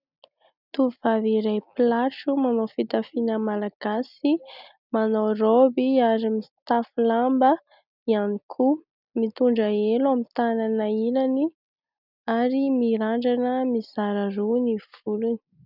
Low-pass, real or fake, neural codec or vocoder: 5.4 kHz; real; none